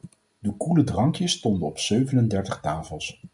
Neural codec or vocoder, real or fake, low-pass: none; real; 10.8 kHz